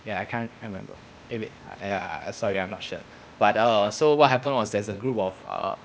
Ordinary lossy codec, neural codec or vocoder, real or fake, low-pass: none; codec, 16 kHz, 0.8 kbps, ZipCodec; fake; none